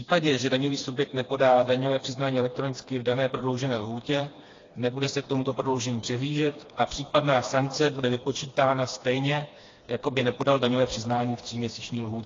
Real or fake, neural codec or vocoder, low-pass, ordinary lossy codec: fake; codec, 16 kHz, 2 kbps, FreqCodec, smaller model; 7.2 kHz; AAC, 32 kbps